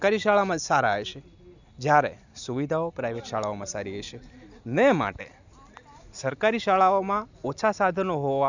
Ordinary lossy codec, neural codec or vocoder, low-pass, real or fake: none; none; 7.2 kHz; real